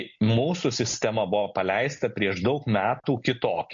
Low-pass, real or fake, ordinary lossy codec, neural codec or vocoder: 7.2 kHz; real; MP3, 48 kbps; none